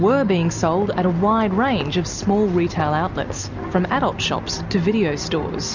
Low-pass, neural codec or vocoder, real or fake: 7.2 kHz; none; real